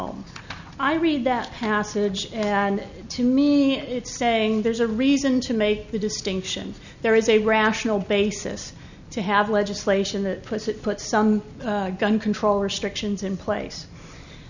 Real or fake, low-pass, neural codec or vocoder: real; 7.2 kHz; none